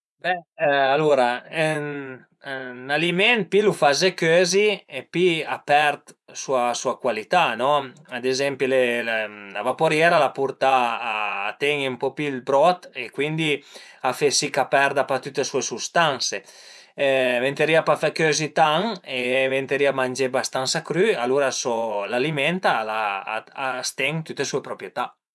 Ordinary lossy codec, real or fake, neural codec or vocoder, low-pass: none; fake; vocoder, 24 kHz, 100 mel bands, Vocos; none